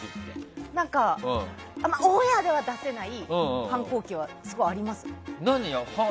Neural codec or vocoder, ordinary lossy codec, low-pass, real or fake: none; none; none; real